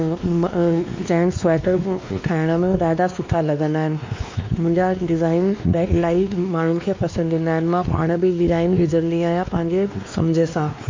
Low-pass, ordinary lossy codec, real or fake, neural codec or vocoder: 7.2 kHz; MP3, 48 kbps; fake; codec, 16 kHz, 2 kbps, X-Codec, WavLM features, trained on Multilingual LibriSpeech